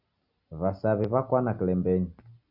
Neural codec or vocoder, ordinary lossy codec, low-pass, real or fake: none; Opus, 64 kbps; 5.4 kHz; real